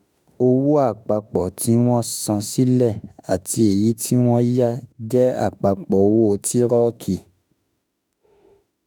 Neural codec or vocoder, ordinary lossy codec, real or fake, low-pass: autoencoder, 48 kHz, 32 numbers a frame, DAC-VAE, trained on Japanese speech; none; fake; none